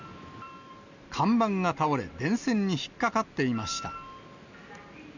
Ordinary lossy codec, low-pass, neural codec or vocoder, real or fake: none; 7.2 kHz; none; real